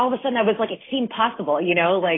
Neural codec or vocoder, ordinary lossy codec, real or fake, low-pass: none; AAC, 16 kbps; real; 7.2 kHz